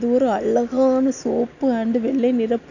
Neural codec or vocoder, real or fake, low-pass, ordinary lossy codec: none; real; 7.2 kHz; none